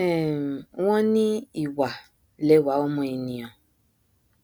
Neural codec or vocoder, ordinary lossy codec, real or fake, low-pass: none; none; real; none